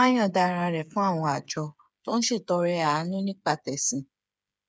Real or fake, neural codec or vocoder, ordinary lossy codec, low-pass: fake; codec, 16 kHz, 8 kbps, FreqCodec, smaller model; none; none